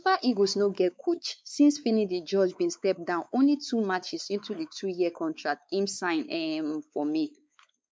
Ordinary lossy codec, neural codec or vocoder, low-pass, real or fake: none; codec, 16 kHz, 4 kbps, X-Codec, WavLM features, trained on Multilingual LibriSpeech; none; fake